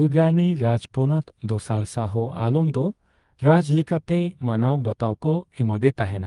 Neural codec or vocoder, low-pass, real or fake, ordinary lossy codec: codec, 24 kHz, 0.9 kbps, WavTokenizer, medium music audio release; 10.8 kHz; fake; Opus, 32 kbps